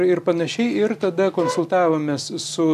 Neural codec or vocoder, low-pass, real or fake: none; 14.4 kHz; real